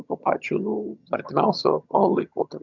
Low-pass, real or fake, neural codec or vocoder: 7.2 kHz; fake; vocoder, 22.05 kHz, 80 mel bands, HiFi-GAN